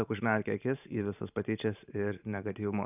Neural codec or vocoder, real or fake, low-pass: vocoder, 44.1 kHz, 128 mel bands every 512 samples, BigVGAN v2; fake; 3.6 kHz